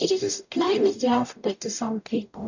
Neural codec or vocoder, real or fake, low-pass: codec, 44.1 kHz, 0.9 kbps, DAC; fake; 7.2 kHz